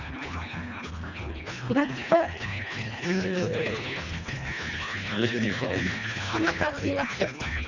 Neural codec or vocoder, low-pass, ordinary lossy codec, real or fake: codec, 24 kHz, 1.5 kbps, HILCodec; 7.2 kHz; none; fake